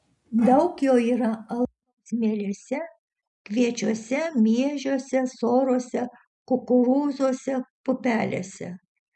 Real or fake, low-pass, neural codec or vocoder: real; 10.8 kHz; none